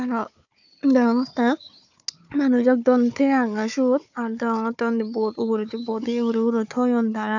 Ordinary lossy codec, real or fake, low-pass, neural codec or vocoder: none; fake; 7.2 kHz; codec, 16 kHz, 4 kbps, FunCodec, trained on Chinese and English, 50 frames a second